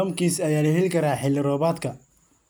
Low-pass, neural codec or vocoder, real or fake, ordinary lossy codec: none; none; real; none